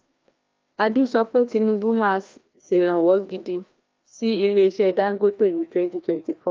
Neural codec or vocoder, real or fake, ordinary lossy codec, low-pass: codec, 16 kHz, 1 kbps, FreqCodec, larger model; fake; Opus, 24 kbps; 7.2 kHz